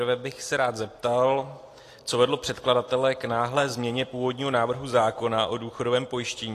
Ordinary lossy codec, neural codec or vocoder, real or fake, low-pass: AAC, 48 kbps; none; real; 14.4 kHz